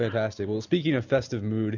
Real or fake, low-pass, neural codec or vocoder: real; 7.2 kHz; none